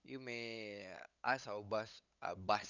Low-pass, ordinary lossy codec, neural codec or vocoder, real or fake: 7.2 kHz; none; codec, 16 kHz, 8 kbps, FunCodec, trained on LibriTTS, 25 frames a second; fake